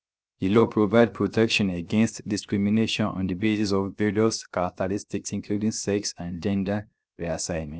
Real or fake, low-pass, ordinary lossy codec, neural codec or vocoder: fake; none; none; codec, 16 kHz, 0.7 kbps, FocalCodec